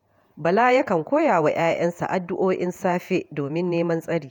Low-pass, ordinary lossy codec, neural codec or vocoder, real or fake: 19.8 kHz; none; vocoder, 48 kHz, 128 mel bands, Vocos; fake